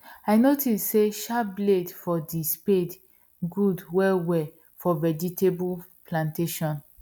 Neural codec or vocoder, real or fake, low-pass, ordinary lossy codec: none; real; 19.8 kHz; none